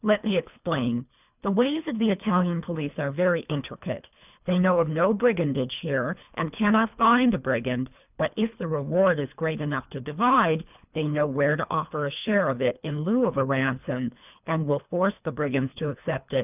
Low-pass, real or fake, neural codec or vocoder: 3.6 kHz; fake; codec, 24 kHz, 3 kbps, HILCodec